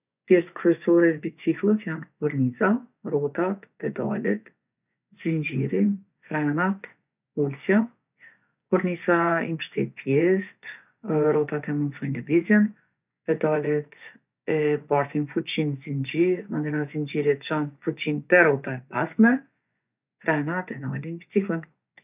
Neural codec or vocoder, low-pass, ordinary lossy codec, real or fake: vocoder, 24 kHz, 100 mel bands, Vocos; 3.6 kHz; none; fake